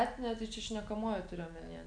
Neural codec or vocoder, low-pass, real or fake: none; 9.9 kHz; real